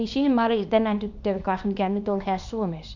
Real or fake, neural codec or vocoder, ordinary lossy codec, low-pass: fake; codec, 24 kHz, 0.9 kbps, WavTokenizer, small release; none; 7.2 kHz